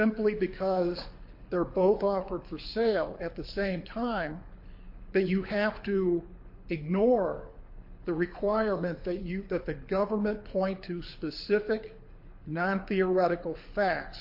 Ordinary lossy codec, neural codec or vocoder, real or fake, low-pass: MP3, 32 kbps; codec, 24 kHz, 6 kbps, HILCodec; fake; 5.4 kHz